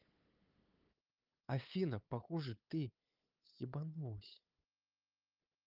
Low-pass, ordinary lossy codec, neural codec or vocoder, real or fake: 5.4 kHz; Opus, 32 kbps; codec, 16 kHz, 8 kbps, FunCodec, trained on LibriTTS, 25 frames a second; fake